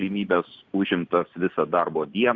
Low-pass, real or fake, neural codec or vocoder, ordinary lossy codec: 7.2 kHz; real; none; Opus, 64 kbps